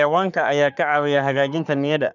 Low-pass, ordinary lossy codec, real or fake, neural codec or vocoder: 7.2 kHz; none; fake; codec, 44.1 kHz, 3.4 kbps, Pupu-Codec